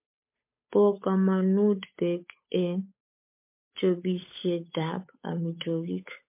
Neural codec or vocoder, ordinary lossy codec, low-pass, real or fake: codec, 16 kHz, 8 kbps, FunCodec, trained on Chinese and English, 25 frames a second; MP3, 24 kbps; 3.6 kHz; fake